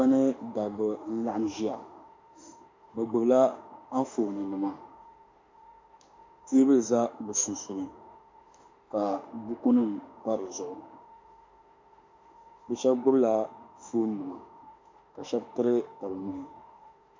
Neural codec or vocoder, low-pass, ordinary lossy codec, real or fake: autoencoder, 48 kHz, 32 numbers a frame, DAC-VAE, trained on Japanese speech; 7.2 kHz; MP3, 48 kbps; fake